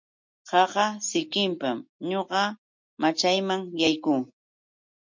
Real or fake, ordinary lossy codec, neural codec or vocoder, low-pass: real; MP3, 48 kbps; none; 7.2 kHz